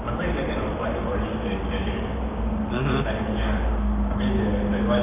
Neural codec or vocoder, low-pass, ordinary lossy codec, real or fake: codec, 44.1 kHz, 7.8 kbps, Pupu-Codec; 3.6 kHz; none; fake